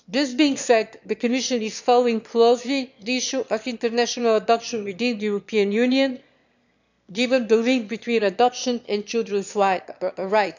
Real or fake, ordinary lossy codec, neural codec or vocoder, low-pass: fake; none; autoencoder, 22.05 kHz, a latent of 192 numbers a frame, VITS, trained on one speaker; 7.2 kHz